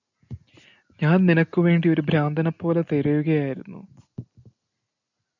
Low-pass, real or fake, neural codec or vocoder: 7.2 kHz; real; none